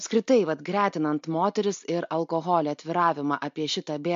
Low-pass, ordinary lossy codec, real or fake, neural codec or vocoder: 7.2 kHz; MP3, 48 kbps; real; none